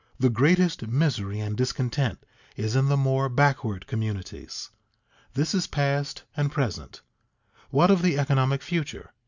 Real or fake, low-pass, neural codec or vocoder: real; 7.2 kHz; none